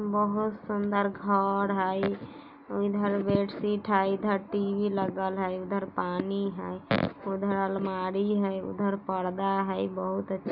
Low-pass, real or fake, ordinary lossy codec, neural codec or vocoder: 5.4 kHz; real; none; none